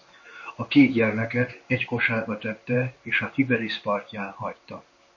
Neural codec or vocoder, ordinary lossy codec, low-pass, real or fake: codec, 16 kHz in and 24 kHz out, 1 kbps, XY-Tokenizer; MP3, 32 kbps; 7.2 kHz; fake